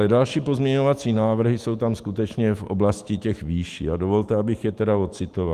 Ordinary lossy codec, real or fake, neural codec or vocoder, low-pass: Opus, 24 kbps; fake; autoencoder, 48 kHz, 128 numbers a frame, DAC-VAE, trained on Japanese speech; 14.4 kHz